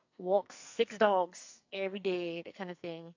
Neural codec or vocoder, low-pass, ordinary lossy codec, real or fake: codec, 44.1 kHz, 2.6 kbps, SNAC; 7.2 kHz; AAC, 48 kbps; fake